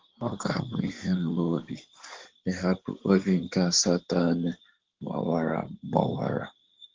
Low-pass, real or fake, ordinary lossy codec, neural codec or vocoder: 7.2 kHz; fake; Opus, 16 kbps; codec, 16 kHz in and 24 kHz out, 2.2 kbps, FireRedTTS-2 codec